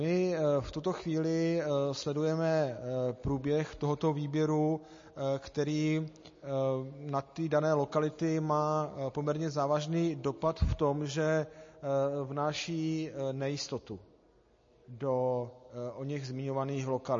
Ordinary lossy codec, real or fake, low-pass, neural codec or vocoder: MP3, 32 kbps; real; 7.2 kHz; none